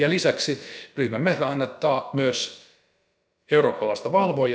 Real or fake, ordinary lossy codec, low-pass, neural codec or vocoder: fake; none; none; codec, 16 kHz, about 1 kbps, DyCAST, with the encoder's durations